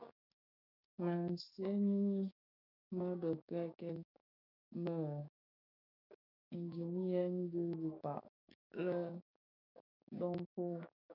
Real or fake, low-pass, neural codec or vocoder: fake; 5.4 kHz; codec, 16 kHz, 6 kbps, DAC